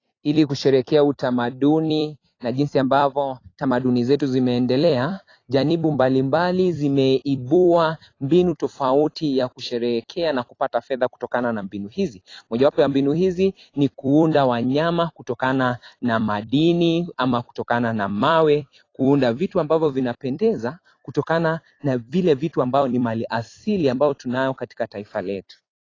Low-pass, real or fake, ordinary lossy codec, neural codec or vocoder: 7.2 kHz; fake; AAC, 32 kbps; vocoder, 44.1 kHz, 128 mel bands every 256 samples, BigVGAN v2